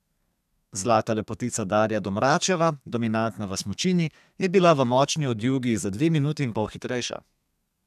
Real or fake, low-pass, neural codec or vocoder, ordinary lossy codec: fake; 14.4 kHz; codec, 32 kHz, 1.9 kbps, SNAC; none